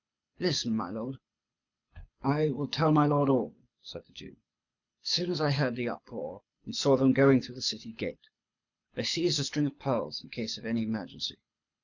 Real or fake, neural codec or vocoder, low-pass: fake; codec, 24 kHz, 6 kbps, HILCodec; 7.2 kHz